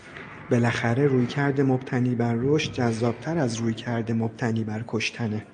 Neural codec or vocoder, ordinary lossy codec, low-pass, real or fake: none; MP3, 64 kbps; 9.9 kHz; real